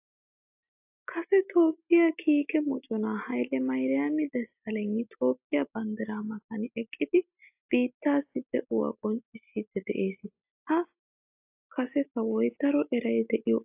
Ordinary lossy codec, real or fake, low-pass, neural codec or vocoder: AAC, 32 kbps; real; 3.6 kHz; none